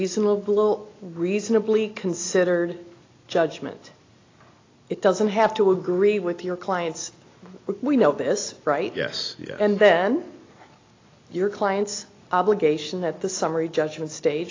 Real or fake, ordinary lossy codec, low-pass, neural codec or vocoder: real; AAC, 32 kbps; 7.2 kHz; none